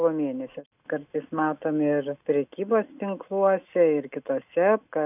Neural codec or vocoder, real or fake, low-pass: none; real; 3.6 kHz